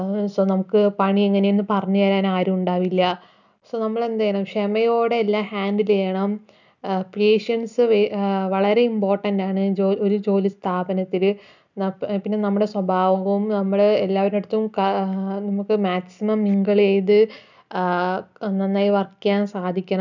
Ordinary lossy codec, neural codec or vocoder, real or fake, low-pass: none; none; real; 7.2 kHz